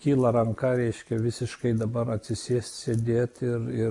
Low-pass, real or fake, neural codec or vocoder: 10.8 kHz; real; none